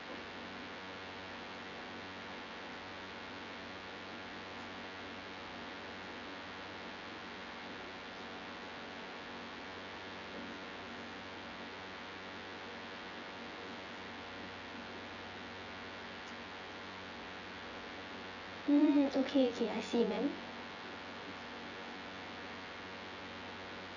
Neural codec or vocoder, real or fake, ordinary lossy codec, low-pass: vocoder, 24 kHz, 100 mel bands, Vocos; fake; none; 7.2 kHz